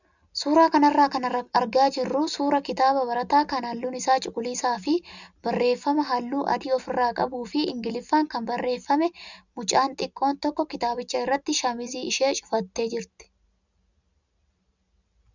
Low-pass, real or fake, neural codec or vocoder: 7.2 kHz; real; none